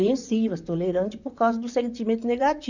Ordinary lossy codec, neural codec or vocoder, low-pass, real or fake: none; vocoder, 44.1 kHz, 128 mel bands, Pupu-Vocoder; 7.2 kHz; fake